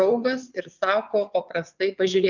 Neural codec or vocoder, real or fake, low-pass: vocoder, 22.05 kHz, 80 mel bands, WaveNeXt; fake; 7.2 kHz